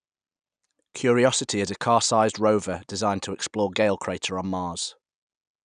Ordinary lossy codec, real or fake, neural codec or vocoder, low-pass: none; real; none; 9.9 kHz